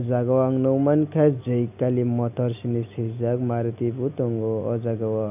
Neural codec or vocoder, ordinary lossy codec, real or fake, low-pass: none; none; real; 3.6 kHz